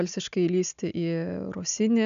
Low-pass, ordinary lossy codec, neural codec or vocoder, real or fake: 7.2 kHz; MP3, 96 kbps; none; real